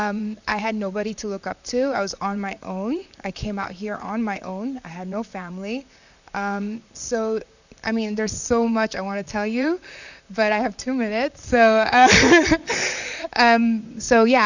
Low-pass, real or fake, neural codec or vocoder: 7.2 kHz; fake; vocoder, 44.1 kHz, 128 mel bands, Pupu-Vocoder